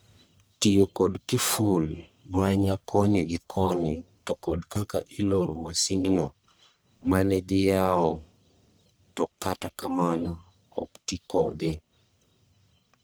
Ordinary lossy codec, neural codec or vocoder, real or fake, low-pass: none; codec, 44.1 kHz, 1.7 kbps, Pupu-Codec; fake; none